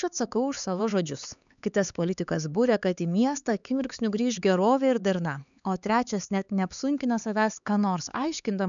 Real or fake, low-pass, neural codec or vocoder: fake; 7.2 kHz; codec, 16 kHz, 4 kbps, X-Codec, HuBERT features, trained on LibriSpeech